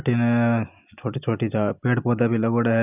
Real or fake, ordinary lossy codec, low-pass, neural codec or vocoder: real; none; 3.6 kHz; none